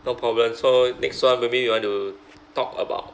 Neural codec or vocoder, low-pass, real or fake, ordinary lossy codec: none; none; real; none